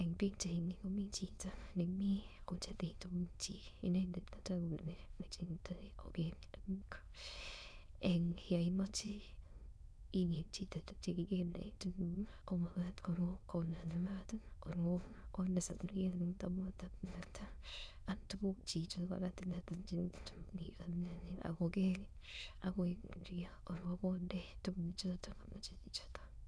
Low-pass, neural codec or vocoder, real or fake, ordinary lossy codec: none; autoencoder, 22.05 kHz, a latent of 192 numbers a frame, VITS, trained on many speakers; fake; none